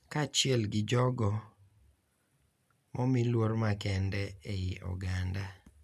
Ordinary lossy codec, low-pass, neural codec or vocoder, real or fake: none; 14.4 kHz; none; real